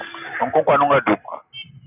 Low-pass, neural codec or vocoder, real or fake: 3.6 kHz; none; real